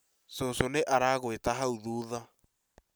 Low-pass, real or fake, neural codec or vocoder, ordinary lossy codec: none; real; none; none